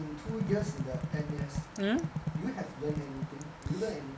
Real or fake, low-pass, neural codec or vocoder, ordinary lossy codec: real; none; none; none